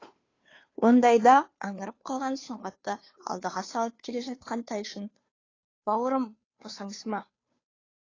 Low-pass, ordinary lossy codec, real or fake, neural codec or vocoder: 7.2 kHz; AAC, 32 kbps; fake; codec, 16 kHz, 2 kbps, FunCodec, trained on Chinese and English, 25 frames a second